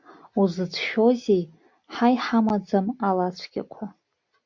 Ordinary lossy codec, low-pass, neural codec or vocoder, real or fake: MP3, 48 kbps; 7.2 kHz; none; real